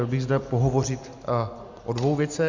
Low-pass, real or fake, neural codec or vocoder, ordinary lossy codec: 7.2 kHz; real; none; Opus, 64 kbps